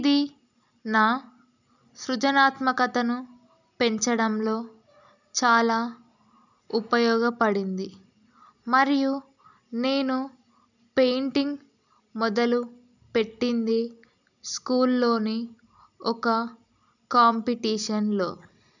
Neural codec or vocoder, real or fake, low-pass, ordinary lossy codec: none; real; 7.2 kHz; none